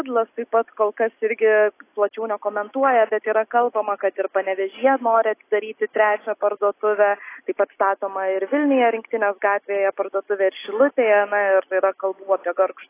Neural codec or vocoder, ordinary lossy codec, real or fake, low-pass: none; AAC, 24 kbps; real; 3.6 kHz